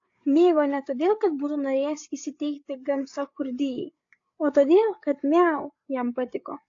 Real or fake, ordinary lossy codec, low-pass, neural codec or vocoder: fake; AAC, 48 kbps; 7.2 kHz; codec, 16 kHz, 8 kbps, FreqCodec, larger model